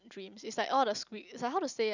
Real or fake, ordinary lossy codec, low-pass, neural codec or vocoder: real; none; 7.2 kHz; none